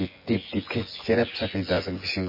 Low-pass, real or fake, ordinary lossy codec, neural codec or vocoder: 5.4 kHz; fake; MP3, 24 kbps; vocoder, 24 kHz, 100 mel bands, Vocos